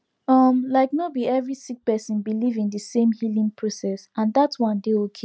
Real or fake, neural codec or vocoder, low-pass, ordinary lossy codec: real; none; none; none